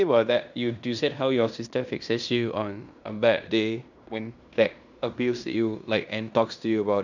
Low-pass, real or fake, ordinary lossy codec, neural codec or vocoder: 7.2 kHz; fake; none; codec, 16 kHz in and 24 kHz out, 0.9 kbps, LongCat-Audio-Codec, fine tuned four codebook decoder